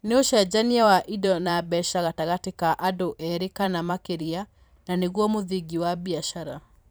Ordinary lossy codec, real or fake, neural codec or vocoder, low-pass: none; fake; vocoder, 44.1 kHz, 128 mel bands every 512 samples, BigVGAN v2; none